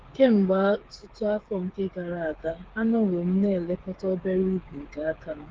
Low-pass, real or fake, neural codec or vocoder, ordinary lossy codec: 7.2 kHz; fake; codec, 16 kHz, 8 kbps, FreqCodec, smaller model; Opus, 16 kbps